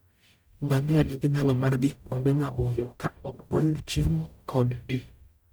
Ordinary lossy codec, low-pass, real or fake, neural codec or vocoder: none; none; fake; codec, 44.1 kHz, 0.9 kbps, DAC